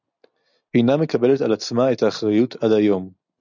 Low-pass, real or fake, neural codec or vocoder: 7.2 kHz; real; none